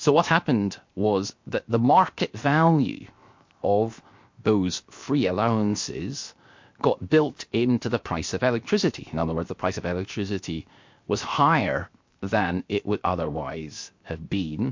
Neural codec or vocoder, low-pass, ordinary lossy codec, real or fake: codec, 16 kHz, 0.7 kbps, FocalCodec; 7.2 kHz; MP3, 48 kbps; fake